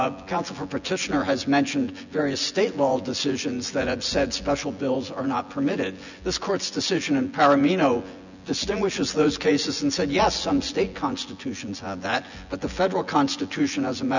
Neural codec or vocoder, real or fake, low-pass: vocoder, 24 kHz, 100 mel bands, Vocos; fake; 7.2 kHz